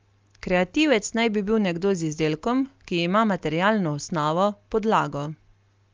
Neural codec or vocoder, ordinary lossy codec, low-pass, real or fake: none; Opus, 32 kbps; 7.2 kHz; real